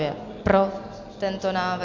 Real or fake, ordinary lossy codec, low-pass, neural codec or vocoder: real; MP3, 64 kbps; 7.2 kHz; none